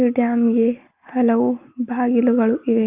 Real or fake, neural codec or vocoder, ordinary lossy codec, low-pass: real; none; Opus, 24 kbps; 3.6 kHz